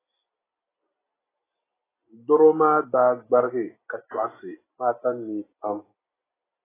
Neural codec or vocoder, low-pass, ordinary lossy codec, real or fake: none; 3.6 kHz; AAC, 16 kbps; real